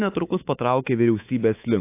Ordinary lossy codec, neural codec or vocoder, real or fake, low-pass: AAC, 24 kbps; codec, 24 kHz, 3.1 kbps, DualCodec; fake; 3.6 kHz